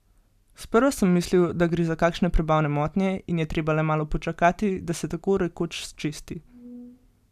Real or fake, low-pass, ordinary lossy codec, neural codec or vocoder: real; 14.4 kHz; none; none